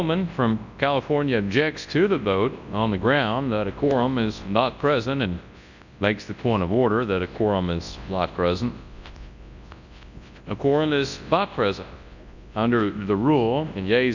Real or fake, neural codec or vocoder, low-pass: fake; codec, 24 kHz, 0.9 kbps, WavTokenizer, large speech release; 7.2 kHz